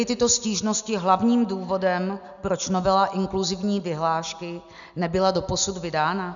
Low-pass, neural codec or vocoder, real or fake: 7.2 kHz; none; real